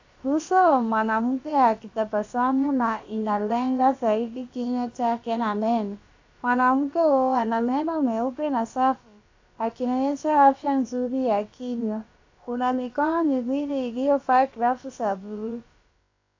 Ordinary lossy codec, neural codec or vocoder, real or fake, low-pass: AAC, 48 kbps; codec, 16 kHz, about 1 kbps, DyCAST, with the encoder's durations; fake; 7.2 kHz